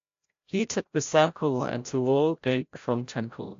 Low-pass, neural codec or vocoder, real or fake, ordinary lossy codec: 7.2 kHz; codec, 16 kHz, 0.5 kbps, FreqCodec, larger model; fake; AAC, 48 kbps